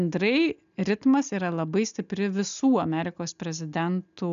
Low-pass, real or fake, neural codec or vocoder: 7.2 kHz; real; none